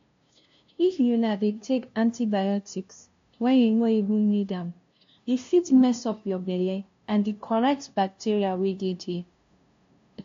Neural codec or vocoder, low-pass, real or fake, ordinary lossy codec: codec, 16 kHz, 0.5 kbps, FunCodec, trained on LibriTTS, 25 frames a second; 7.2 kHz; fake; AAC, 48 kbps